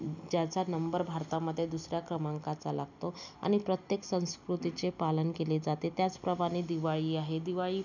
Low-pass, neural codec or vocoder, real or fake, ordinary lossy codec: 7.2 kHz; none; real; none